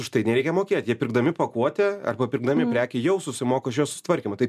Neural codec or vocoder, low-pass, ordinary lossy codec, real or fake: none; 14.4 kHz; MP3, 96 kbps; real